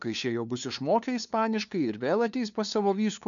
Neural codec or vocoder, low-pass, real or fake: codec, 16 kHz, 2 kbps, FunCodec, trained on LibriTTS, 25 frames a second; 7.2 kHz; fake